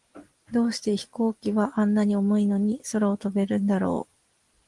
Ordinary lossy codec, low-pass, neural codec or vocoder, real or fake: Opus, 24 kbps; 10.8 kHz; none; real